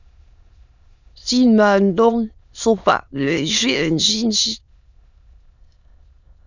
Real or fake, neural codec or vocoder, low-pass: fake; autoencoder, 22.05 kHz, a latent of 192 numbers a frame, VITS, trained on many speakers; 7.2 kHz